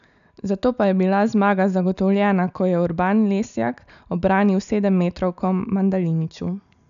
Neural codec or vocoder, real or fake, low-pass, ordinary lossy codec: none; real; 7.2 kHz; none